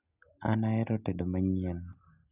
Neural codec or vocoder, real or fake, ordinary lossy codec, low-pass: none; real; none; 3.6 kHz